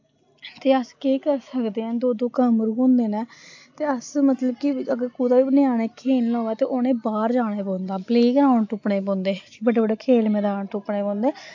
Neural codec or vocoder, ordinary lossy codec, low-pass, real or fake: none; AAC, 48 kbps; 7.2 kHz; real